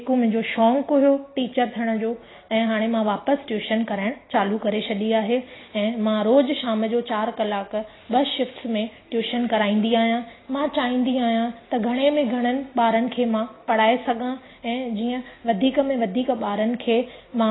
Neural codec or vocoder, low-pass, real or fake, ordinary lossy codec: none; 7.2 kHz; real; AAC, 16 kbps